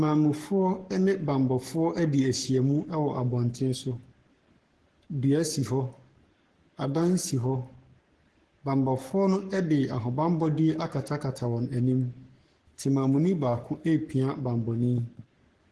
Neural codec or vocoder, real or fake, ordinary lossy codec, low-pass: codec, 44.1 kHz, 7.8 kbps, Pupu-Codec; fake; Opus, 16 kbps; 10.8 kHz